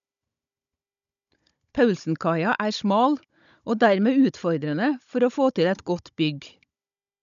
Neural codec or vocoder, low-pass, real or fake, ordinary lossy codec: codec, 16 kHz, 16 kbps, FunCodec, trained on Chinese and English, 50 frames a second; 7.2 kHz; fake; none